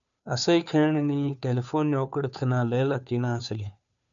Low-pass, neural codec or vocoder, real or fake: 7.2 kHz; codec, 16 kHz, 2 kbps, FunCodec, trained on Chinese and English, 25 frames a second; fake